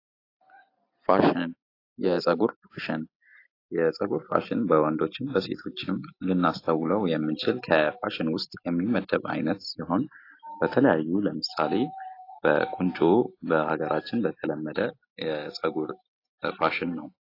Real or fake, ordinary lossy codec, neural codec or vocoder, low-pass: real; AAC, 32 kbps; none; 5.4 kHz